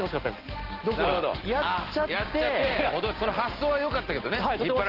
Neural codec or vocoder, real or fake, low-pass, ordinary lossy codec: none; real; 5.4 kHz; Opus, 16 kbps